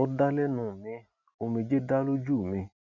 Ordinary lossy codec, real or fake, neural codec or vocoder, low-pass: none; real; none; 7.2 kHz